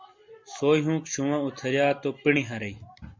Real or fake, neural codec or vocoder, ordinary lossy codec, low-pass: real; none; MP3, 48 kbps; 7.2 kHz